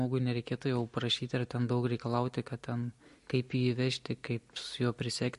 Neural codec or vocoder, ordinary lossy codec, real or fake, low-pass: none; MP3, 48 kbps; real; 14.4 kHz